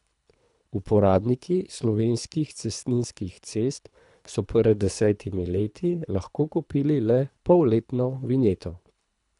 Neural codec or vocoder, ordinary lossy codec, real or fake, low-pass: codec, 24 kHz, 3 kbps, HILCodec; none; fake; 10.8 kHz